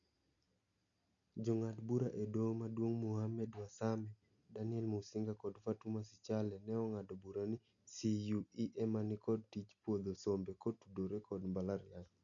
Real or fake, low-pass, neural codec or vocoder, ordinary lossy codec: real; 7.2 kHz; none; none